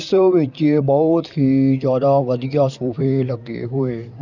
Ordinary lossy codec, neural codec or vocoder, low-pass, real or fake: none; vocoder, 44.1 kHz, 128 mel bands, Pupu-Vocoder; 7.2 kHz; fake